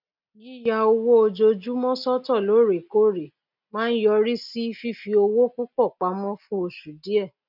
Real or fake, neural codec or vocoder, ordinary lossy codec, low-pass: real; none; none; 5.4 kHz